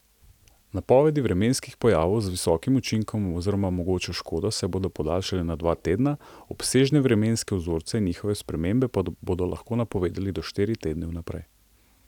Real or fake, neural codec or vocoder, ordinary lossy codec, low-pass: real; none; none; 19.8 kHz